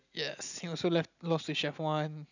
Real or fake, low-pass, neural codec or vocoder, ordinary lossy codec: real; 7.2 kHz; none; none